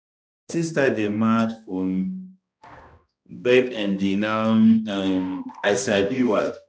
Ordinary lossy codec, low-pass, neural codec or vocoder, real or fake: none; none; codec, 16 kHz, 1 kbps, X-Codec, HuBERT features, trained on balanced general audio; fake